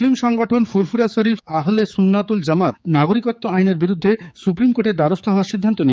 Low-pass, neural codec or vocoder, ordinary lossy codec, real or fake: none; codec, 16 kHz, 4 kbps, X-Codec, HuBERT features, trained on general audio; none; fake